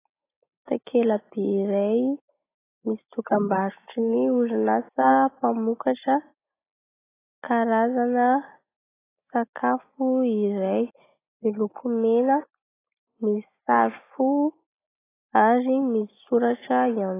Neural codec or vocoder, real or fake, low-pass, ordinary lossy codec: none; real; 3.6 kHz; AAC, 16 kbps